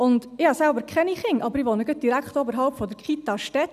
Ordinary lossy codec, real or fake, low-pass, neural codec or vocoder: none; real; 14.4 kHz; none